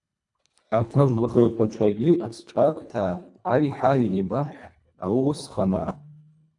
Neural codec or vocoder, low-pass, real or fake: codec, 24 kHz, 1.5 kbps, HILCodec; 10.8 kHz; fake